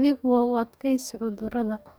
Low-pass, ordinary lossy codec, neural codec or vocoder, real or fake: none; none; codec, 44.1 kHz, 2.6 kbps, DAC; fake